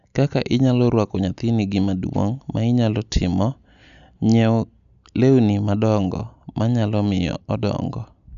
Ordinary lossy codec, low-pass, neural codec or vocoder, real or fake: none; 7.2 kHz; none; real